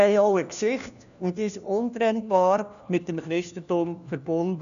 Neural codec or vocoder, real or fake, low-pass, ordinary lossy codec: codec, 16 kHz, 1 kbps, FunCodec, trained on LibriTTS, 50 frames a second; fake; 7.2 kHz; none